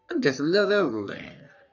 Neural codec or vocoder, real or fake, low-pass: codec, 44.1 kHz, 3.4 kbps, Pupu-Codec; fake; 7.2 kHz